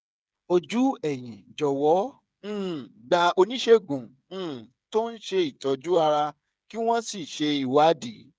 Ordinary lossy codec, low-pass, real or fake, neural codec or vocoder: none; none; fake; codec, 16 kHz, 8 kbps, FreqCodec, smaller model